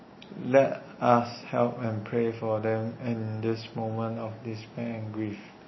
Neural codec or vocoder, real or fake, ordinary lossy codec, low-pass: none; real; MP3, 24 kbps; 7.2 kHz